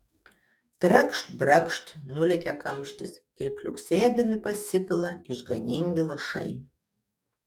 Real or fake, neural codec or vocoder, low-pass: fake; codec, 44.1 kHz, 2.6 kbps, DAC; 19.8 kHz